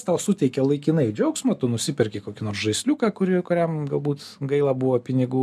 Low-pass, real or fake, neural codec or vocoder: 14.4 kHz; real; none